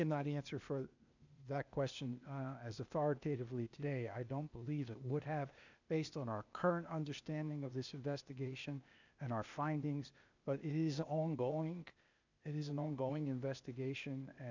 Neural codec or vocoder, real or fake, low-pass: codec, 16 kHz, 0.8 kbps, ZipCodec; fake; 7.2 kHz